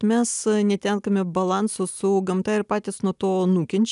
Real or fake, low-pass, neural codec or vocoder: real; 10.8 kHz; none